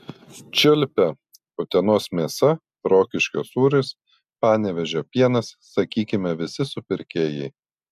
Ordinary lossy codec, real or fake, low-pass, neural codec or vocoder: AAC, 96 kbps; real; 14.4 kHz; none